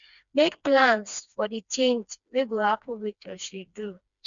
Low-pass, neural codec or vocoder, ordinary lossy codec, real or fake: 7.2 kHz; codec, 16 kHz, 2 kbps, FreqCodec, smaller model; none; fake